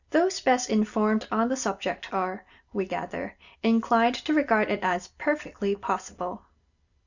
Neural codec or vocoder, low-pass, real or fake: none; 7.2 kHz; real